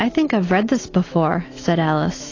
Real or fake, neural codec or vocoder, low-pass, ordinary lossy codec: fake; autoencoder, 48 kHz, 128 numbers a frame, DAC-VAE, trained on Japanese speech; 7.2 kHz; AAC, 32 kbps